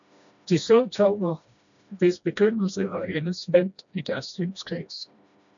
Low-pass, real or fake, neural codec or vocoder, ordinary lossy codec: 7.2 kHz; fake; codec, 16 kHz, 1 kbps, FreqCodec, smaller model; MP3, 64 kbps